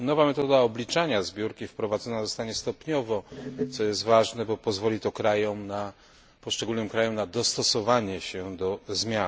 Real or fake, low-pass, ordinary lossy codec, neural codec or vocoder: real; none; none; none